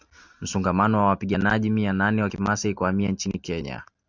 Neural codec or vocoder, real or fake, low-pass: none; real; 7.2 kHz